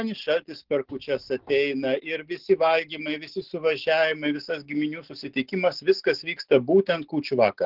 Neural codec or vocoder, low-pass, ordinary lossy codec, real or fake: none; 5.4 kHz; Opus, 16 kbps; real